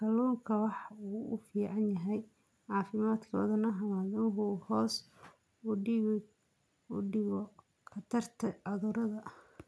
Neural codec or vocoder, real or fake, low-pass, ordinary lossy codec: none; real; none; none